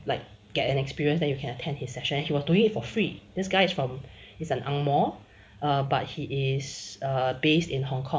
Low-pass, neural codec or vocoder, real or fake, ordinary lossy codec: none; none; real; none